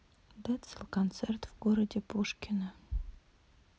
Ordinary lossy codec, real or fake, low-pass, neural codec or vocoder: none; real; none; none